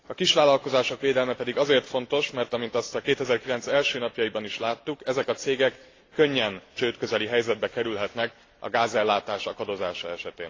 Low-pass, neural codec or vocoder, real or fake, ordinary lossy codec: 7.2 kHz; none; real; AAC, 32 kbps